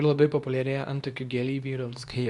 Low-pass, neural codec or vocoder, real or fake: 10.8 kHz; codec, 24 kHz, 0.9 kbps, WavTokenizer, medium speech release version 2; fake